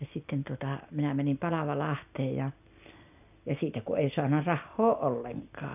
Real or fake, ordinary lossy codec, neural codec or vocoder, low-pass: real; none; none; 3.6 kHz